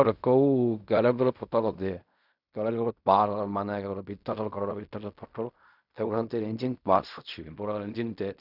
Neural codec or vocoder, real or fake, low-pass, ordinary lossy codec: codec, 16 kHz in and 24 kHz out, 0.4 kbps, LongCat-Audio-Codec, fine tuned four codebook decoder; fake; 5.4 kHz; none